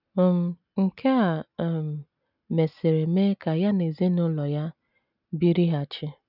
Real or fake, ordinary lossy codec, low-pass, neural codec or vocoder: real; none; 5.4 kHz; none